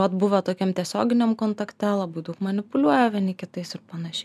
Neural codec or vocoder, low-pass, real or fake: none; 14.4 kHz; real